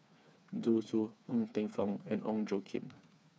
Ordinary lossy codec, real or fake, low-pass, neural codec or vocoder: none; fake; none; codec, 16 kHz, 4 kbps, FreqCodec, smaller model